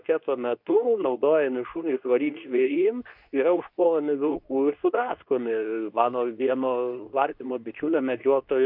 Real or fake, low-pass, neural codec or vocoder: fake; 5.4 kHz; codec, 24 kHz, 0.9 kbps, WavTokenizer, medium speech release version 2